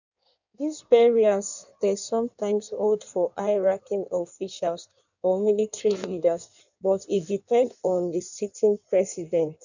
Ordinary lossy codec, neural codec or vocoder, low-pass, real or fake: none; codec, 16 kHz in and 24 kHz out, 1.1 kbps, FireRedTTS-2 codec; 7.2 kHz; fake